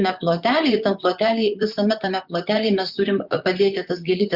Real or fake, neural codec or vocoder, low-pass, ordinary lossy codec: fake; vocoder, 44.1 kHz, 128 mel bands, Pupu-Vocoder; 5.4 kHz; Opus, 64 kbps